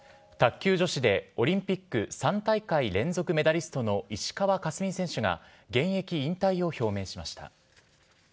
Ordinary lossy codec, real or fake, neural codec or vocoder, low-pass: none; real; none; none